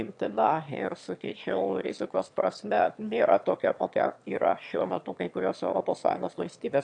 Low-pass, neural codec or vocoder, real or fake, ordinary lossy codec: 9.9 kHz; autoencoder, 22.05 kHz, a latent of 192 numbers a frame, VITS, trained on one speaker; fake; AAC, 64 kbps